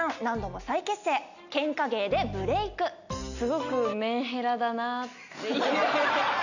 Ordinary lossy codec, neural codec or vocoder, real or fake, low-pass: none; none; real; 7.2 kHz